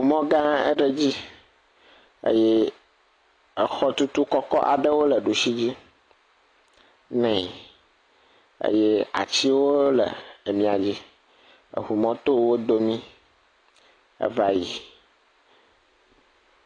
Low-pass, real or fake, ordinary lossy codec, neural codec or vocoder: 9.9 kHz; real; AAC, 32 kbps; none